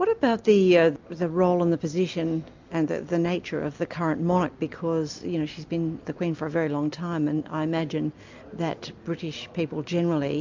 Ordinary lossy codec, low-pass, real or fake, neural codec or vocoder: MP3, 64 kbps; 7.2 kHz; real; none